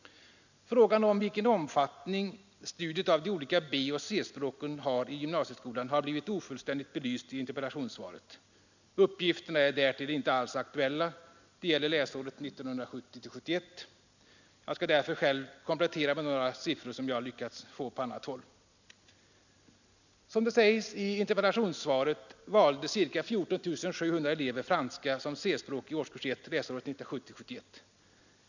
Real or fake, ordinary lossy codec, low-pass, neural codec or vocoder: real; none; 7.2 kHz; none